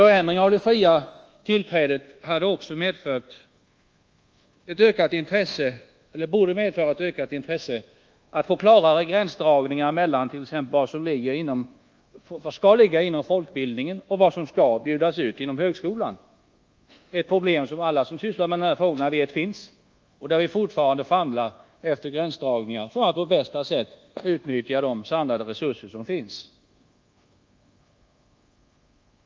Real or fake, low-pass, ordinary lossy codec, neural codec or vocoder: fake; 7.2 kHz; Opus, 24 kbps; codec, 24 kHz, 1.2 kbps, DualCodec